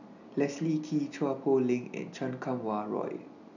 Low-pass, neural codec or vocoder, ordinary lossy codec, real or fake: 7.2 kHz; none; none; real